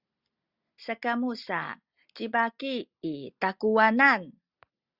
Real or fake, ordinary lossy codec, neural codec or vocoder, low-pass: real; Opus, 64 kbps; none; 5.4 kHz